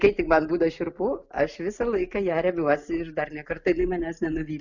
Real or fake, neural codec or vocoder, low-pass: real; none; 7.2 kHz